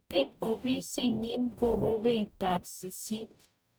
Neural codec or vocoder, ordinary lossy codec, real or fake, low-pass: codec, 44.1 kHz, 0.9 kbps, DAC; none; fake; none